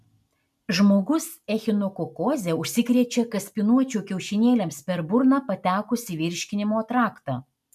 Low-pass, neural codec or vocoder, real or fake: 14.4 kHz; none; real